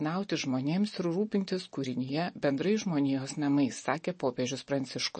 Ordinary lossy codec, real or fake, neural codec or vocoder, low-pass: MP3, 32 kbps; real; none; 10.8 kHz